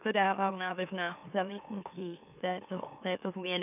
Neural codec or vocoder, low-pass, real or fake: autoencoder, 44.1 kHz, a latent of 192 numbers a frame, MeloTTS; 3.6 kHz; fake